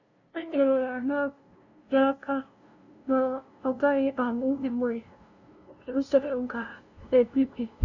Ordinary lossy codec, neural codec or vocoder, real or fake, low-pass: none; codec, 16 kHz, 0.5 kbps, FunCodec, trained on LibriTTS, 25 frames a second; fake; 7.2 kHz